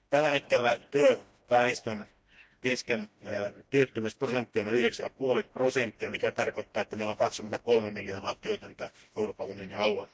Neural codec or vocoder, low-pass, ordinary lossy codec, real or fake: codec, 16 kHz, 1 kbps, FreqCodec, smaller model; none; none; fake